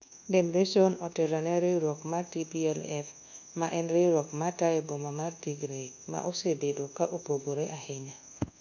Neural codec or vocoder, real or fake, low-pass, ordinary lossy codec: codec, 24 kHz, 1.2 kbps, DualCodec; fake; 7.2 kHz; none